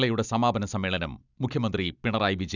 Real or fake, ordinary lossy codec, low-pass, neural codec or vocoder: real; none; 7.2 kHz; none